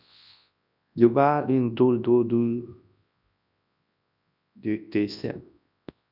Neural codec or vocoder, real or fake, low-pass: codec, 24 kHz, 0.9 kbps, WavTokenizer, large speech release; fake; 5.4 kHz